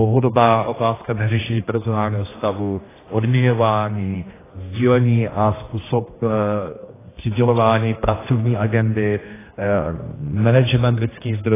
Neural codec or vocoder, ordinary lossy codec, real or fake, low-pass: codec, 16 kHz, 1 kbps, X-Codec, HuBERT features, trained on general audio; AAC, 16 kbps; fake; 3.6 kHz